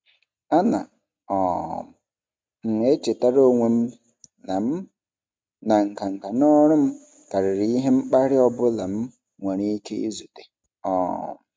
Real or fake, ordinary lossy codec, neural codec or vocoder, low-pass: real; none; none; none